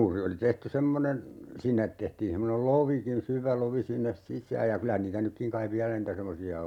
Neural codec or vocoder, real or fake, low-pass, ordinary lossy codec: none; real; 19.8 kHz; none